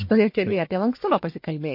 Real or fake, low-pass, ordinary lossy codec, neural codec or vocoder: fake; 5.4 kHz; MP3, 32 kbps; codec, 44.1 kHz, 1.7 kbps, Pupu-Codec